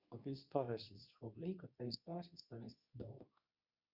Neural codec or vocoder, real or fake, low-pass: codec, 24 kHz, 0.9 kbps, WavTokenizer, medium speech release version 2; fake; 5.4 kHz